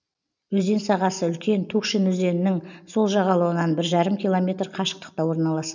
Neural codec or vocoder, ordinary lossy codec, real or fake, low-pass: none; none; real; 7.2 kHz